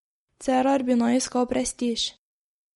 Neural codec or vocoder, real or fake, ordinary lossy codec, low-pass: none; real; MP3, 48 kbps; 19.8 kHz